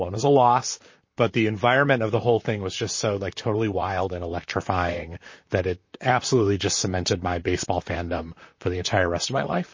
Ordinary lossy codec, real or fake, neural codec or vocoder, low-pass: MP3, 32 kbps; fake; vocoder, 44.1 kHz, 128 mel bands, Pupu-Vocoder; 7.2 kHz